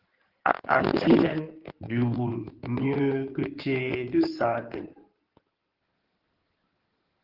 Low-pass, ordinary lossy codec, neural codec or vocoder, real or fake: 5.4 kHz; Opus, 16 kbps; codec, 16 kHz in and 24 kHz out, 2.2 kbps, FireRedTTS-2 codec; fake